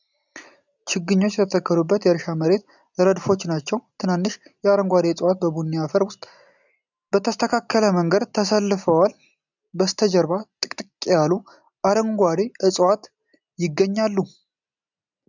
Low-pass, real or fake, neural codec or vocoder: 7.2 kHz; real; none